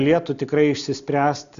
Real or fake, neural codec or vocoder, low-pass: real; none; 7.2 kHz